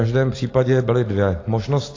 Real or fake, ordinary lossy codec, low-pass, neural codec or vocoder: real; AAC, 32 kbps; 7.2 kHz; none